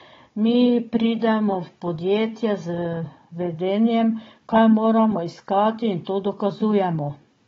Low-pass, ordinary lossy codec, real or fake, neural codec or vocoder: 7.2 kHz; AAC, 24 kbps; fake; codec, 16 kHz, 16 kbps, FunCodec, trained on Chinese and English, 50 frames a second